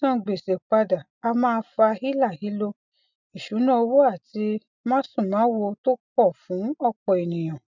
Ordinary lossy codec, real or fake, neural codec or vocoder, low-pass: none; real; none; 7.2 kHz